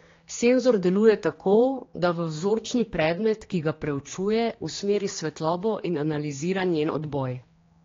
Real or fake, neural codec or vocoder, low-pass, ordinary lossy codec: fake; codec, 16 kHz, 2 kbps, X-Codec, HuBERT features, trained on general audio; 7.2 kHz; AAC, 32 kbps